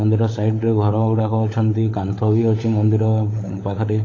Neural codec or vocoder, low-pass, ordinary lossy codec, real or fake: codec, 16 kHz, 16 kbps, FunCodec, trained on LibriTTS, 50 frames a second; 7.2 kHz; AAC, 32 kbps; fake